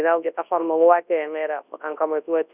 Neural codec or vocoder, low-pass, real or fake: codec, 24 kHz, 0.9 kbps, WavTokenizer, large speech release; 3.6 kHz; fake